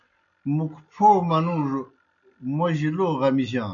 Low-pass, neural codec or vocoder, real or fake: 7.2 kHz; none; real